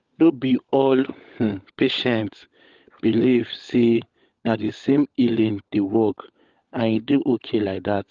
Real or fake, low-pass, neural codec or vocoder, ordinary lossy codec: fake; 7.2 kHz; codec, 16 kHz, 16 kbps, FunCodec, trained on LibriTTS, 50 frames a second; Opus, 32 kbps